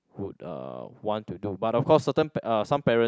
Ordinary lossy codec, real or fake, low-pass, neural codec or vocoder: none; real; none; none